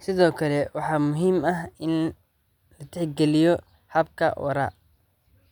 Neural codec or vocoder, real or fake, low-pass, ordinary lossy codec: none; real; 19.8 kHz; none